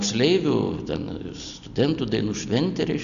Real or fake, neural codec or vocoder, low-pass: real; none; 7.2 kHz